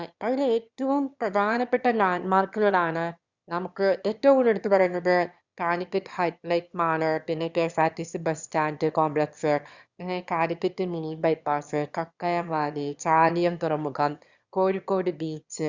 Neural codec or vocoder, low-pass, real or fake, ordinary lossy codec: autoencoder, 22.05 kHz, a latent of 192 numbers a frame, VITS, trained on one speaker; 7.2 kHz; fake; Opus, 64 kbps